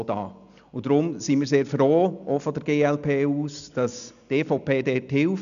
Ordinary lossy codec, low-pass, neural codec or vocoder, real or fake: none; 7.2 kHz; none; real